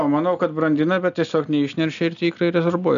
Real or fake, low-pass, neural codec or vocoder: real; 7.2 kHz; none